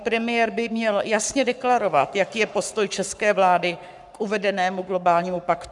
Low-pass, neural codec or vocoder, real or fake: 10.8 kHz; codec, 44.1 kHz, 7.8 kbps, Pupu-Codec; fake